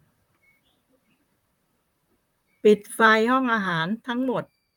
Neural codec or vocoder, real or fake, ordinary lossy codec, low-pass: vocoder, 44.1 kHz, 128 mel bands, Pupu-Vocoder; fake; none; 19.8 kHz